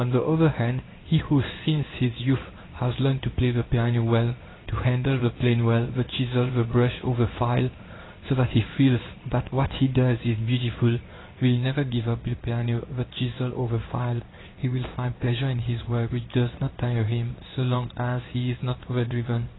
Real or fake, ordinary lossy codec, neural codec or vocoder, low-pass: fake; AAC, 16 kbps; codec, 16 kHz in and 24 kHz out, 1 kbps, XY-Tokenizer; 7.2 kHz